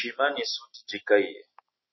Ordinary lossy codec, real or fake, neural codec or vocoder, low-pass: MP3, 24 kbps; real; none; 7.2 kHz